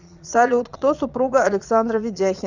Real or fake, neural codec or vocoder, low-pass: fake; vocoder, 22.05 kHz, 80 mel bands, Vocos; 7.2 kHz